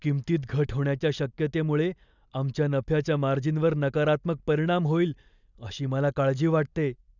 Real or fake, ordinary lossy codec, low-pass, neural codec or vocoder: real; none; 7.2 kHz; none